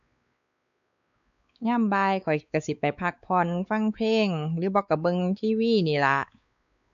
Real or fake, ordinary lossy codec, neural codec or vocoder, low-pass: fake; none; codec, 16 kHz, 4 kbps, X-Codec, WavLM features, trained on Multilingual LibriSpeech; 7.2 kHz